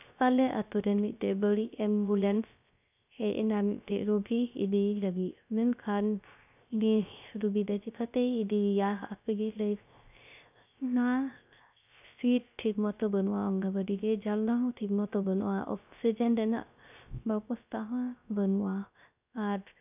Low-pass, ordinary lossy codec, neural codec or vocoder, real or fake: 3.6 kHz; none; codec, 16 kHz, 0.3 kbps, FocalCodec; fake